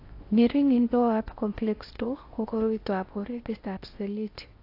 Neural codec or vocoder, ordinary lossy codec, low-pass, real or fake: codec, 16 kHz in and 24 kHz out, 0.6 kbps, FocalCodec, streaming, 4096 codes; AAC, 32 kbps; 5.4 kHz; fake